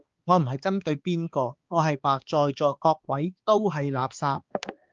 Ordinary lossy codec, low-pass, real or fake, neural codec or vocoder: Opus, 32 kbps; 7.2 kHz; fake; codec, 16 kHz, 2 kbps, X-Codec, HuBERT features, trained on balanced general audio